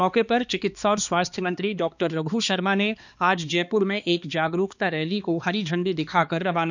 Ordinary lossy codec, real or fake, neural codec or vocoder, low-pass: none; fake; codec, 16 kHz, 2 kbps, X-Codec, HuBERT features, trained on balanced general audio; 7.2 kHz